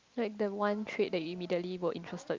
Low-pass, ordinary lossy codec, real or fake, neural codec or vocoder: 7.2 kHz; Opus, 24 kbps; real; none